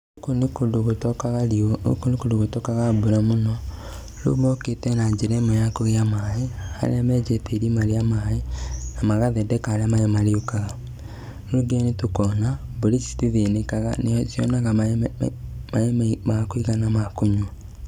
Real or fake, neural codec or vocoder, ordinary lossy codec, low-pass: real; none; none; 19.8 kHz